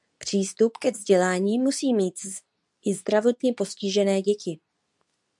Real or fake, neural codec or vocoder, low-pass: fake; codec, 24 kHz, 0.9 kbps, WavTokenizer, medium speech release version 2; 10.8 kHz